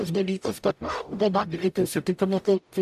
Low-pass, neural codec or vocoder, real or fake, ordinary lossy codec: 14.4 kHz; codec, 44.1 kHz, 0.9 kbps, DAC; fake; AAC, 96 kbps